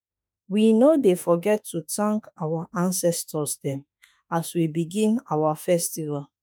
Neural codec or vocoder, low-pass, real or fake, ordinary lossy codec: autoencoder, 48 kHz, 32 numbers a frame, DAC-VAE, trained on Japanese speech; none; fake; none